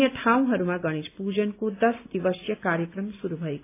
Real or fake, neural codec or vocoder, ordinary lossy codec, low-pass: real; none; AAC, 24 kbps; 3.6 kHz